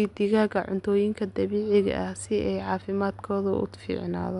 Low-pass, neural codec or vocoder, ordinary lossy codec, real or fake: 10.8 kHz; none; none; real